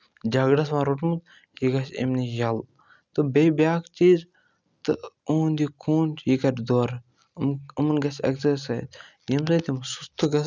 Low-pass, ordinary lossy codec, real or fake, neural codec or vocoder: 7.2 kHz; none; real; none